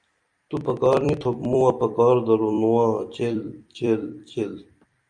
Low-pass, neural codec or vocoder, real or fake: 9.9 kHz; vocoder, 44.1 kHz, 128 mel bands every 256 samples, BigVGAN v2; fake